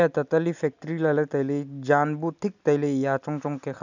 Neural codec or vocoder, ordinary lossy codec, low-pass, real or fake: none; none; 7.2 kHz; real